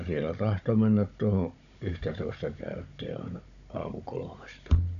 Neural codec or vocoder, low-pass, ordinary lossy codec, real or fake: codec, 16 kHz, 16 kbps, FunCodec, trained on Chinese and English, 50 frames a second; 7.2 kHz; none; fake